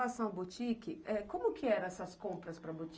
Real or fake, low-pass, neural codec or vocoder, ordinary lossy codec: real; none; none; none